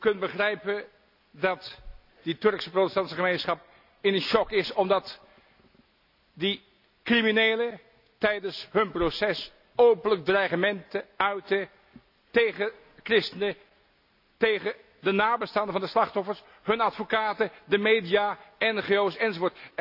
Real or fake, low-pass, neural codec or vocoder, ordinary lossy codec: real; 5.4 kHz; none; none